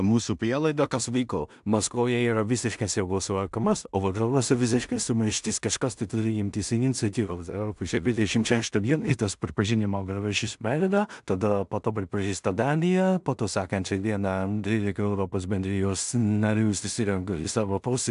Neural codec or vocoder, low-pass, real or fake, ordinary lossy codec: codec, 16 kHz in and 24 kHz out, 0.4 kbps, LongCat-Audio-Codec, two codebook decoder; 10.8 kHz; fake; AAC, 96 kbps